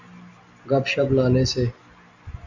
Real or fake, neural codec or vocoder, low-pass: real; none; 7.2 kHz